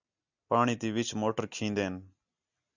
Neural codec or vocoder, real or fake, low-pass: none; real; 7.2 kHz